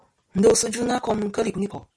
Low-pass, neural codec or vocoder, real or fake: 9.9 kHz; none; real